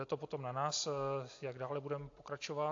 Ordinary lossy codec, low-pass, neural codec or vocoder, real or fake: AAC, 48 kbps; 7.2 kHz; none; real